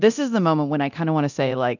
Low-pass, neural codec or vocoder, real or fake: 7.2 kHz; codec, 24 kHz, 0.9 kbps, DualCodec; fake